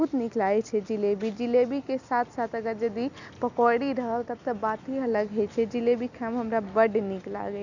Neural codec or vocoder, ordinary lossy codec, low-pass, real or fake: none; none; 7.2 kHz; real